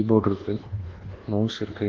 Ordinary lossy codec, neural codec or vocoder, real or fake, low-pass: Opus, 16 kbps; codec, 24 kHz, 1.2 kbps, DualCodec; fake; 7.2 kHz